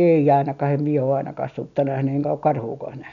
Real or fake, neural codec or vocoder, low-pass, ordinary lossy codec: real; none; 7.2 kHz; none